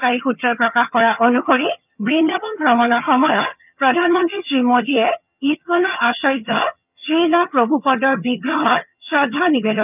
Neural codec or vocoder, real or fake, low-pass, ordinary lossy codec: vocoder, 22.05 kHz, 80 mel bands, HiFi-GAN; fake; 3.6 kHz; none